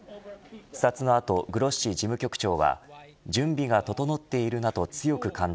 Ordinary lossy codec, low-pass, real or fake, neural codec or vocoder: none; none; real; none